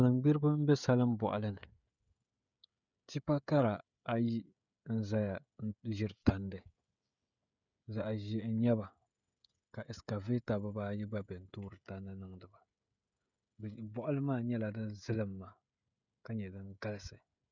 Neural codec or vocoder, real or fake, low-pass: codec, 16 kHz, 16 kbps, FreqCodec, smaller model; fake; 7.2 kHz